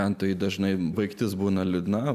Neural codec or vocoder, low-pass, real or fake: none; 14.4 kHz; real